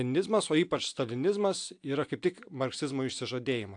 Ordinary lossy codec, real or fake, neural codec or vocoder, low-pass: AAC, 64 kbps; real; none; 9.9 kHz